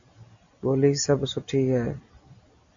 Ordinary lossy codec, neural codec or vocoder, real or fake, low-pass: AAC, 64 kbps; none; real; 7.2 kHz